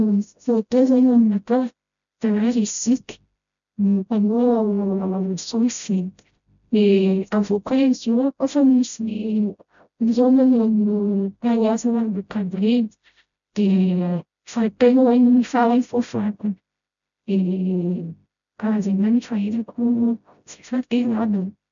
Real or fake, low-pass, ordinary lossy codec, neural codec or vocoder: fake; 7.2 kHz; none; codec, 16 kHz, 0.5 kbps, FreqCodec, smaller model